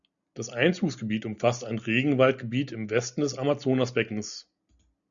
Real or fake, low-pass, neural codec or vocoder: real; 7.2 kHz; none